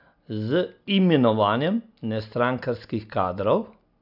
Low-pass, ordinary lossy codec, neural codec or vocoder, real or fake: 5.4 kHz; none; none; real